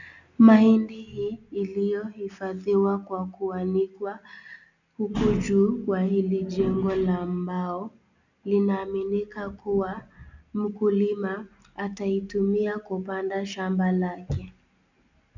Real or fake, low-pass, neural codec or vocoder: real; 7.2 kHz; none